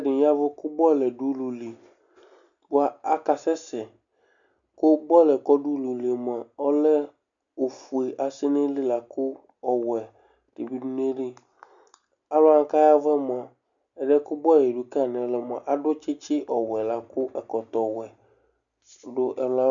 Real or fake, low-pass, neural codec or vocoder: real; 7.2 kHz; none